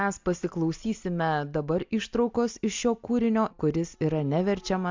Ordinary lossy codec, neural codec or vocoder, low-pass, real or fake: MP3, 64 kbps; none; 7.2 kHz; real